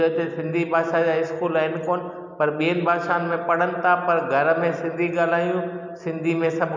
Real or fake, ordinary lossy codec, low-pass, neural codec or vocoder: real; MP3, 64 kbps; 7.2 kHz; none